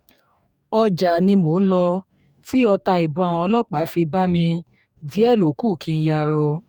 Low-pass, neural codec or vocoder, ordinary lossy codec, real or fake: 19.8 kHz; codec, 44.1 kHz, 2.6 kbps, DAC; none; fake